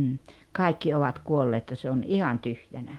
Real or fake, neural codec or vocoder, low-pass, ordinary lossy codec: fake; autoencoder, 48 kHz, 128 numbers a frame, DAC-VAE, trained on Japanese speech; 19.8 kHz; Opus, 24 kbps